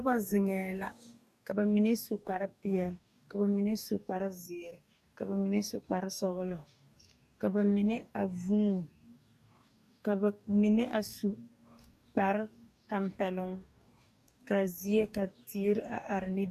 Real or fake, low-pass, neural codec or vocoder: fake; 14.4 kHz; codec, 44.1 kHz, 2.6 kbps, DAC